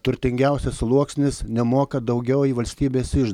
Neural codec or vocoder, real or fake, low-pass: none; real; 19.8 kHz